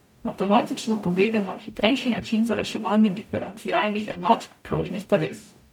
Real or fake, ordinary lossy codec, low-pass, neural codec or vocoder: fake; none; 19.8 kHz; codec, 44.1 kHz, 0.9 kbps, DAC